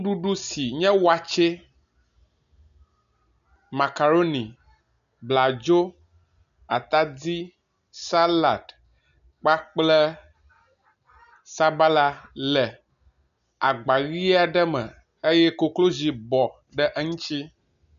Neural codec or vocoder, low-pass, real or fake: none; 7.2 kHz; real